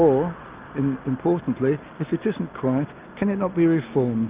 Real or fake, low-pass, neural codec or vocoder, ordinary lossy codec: real; 3.6 kHz; none; Opus, 32 kbps